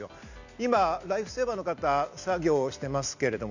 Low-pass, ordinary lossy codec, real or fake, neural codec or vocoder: 7.2 kHz; none; real; none